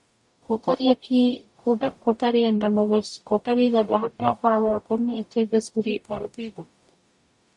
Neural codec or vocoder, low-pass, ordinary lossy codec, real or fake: codec, 44.1 kHz, 0.9 kbps, DAC; 10.8 kHz; MP3, 64 kbps; fake